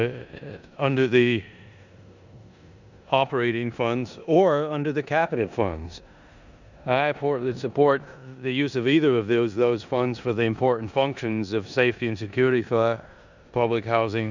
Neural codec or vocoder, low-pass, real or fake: codec, 16 kHz in and 24 kHz out, 0.9 kbps, LongCat-Audio-Codec, four codebook decoder; 7.2 kHz; fake